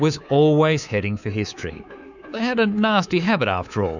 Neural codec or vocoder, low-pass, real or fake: codec, 24 kHz, 3.1 kbps, DualCodec; 7.2 kHz; fake